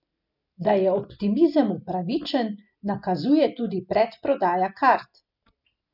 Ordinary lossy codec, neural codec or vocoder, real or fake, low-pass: none; none; real; 5.4 kHz